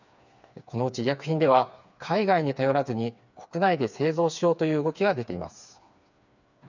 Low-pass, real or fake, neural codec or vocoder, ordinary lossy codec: 7.2 kHz; fake; codec, 16 kHz, 4 kbps, FreqCodec, smaller model; none